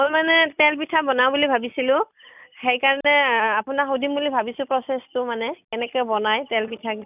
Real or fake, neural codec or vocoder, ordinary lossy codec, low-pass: real; none; none; 3.6 kHz